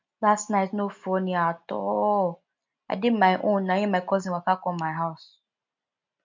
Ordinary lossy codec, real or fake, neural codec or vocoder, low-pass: MP3, 64 kbps; real; none; 7.2 kHz